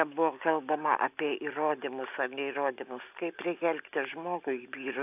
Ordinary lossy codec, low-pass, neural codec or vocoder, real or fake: AAC, 32 kbps; 3.6 kHz; none; real